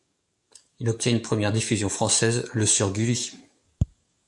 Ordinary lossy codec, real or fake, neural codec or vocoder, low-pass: MP3, 96 kbps; fake; codec, 44.1 kHz, 7.8 kbps, DAC; 10.8 kHz